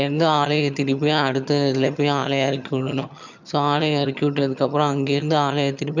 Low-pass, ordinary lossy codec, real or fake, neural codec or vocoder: 7.2 kHz; none; fake; vocoder, 22.05 kHz, 80 mel bands, HiFi-GAN